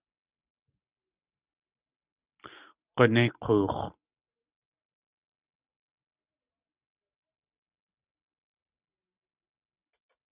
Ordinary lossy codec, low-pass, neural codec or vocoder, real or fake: Opus, 64 kbps; 3.6 kHz; none; real